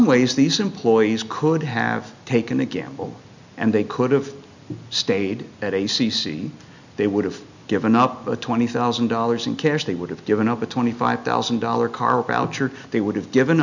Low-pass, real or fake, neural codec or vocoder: 7.2 kHz; real; none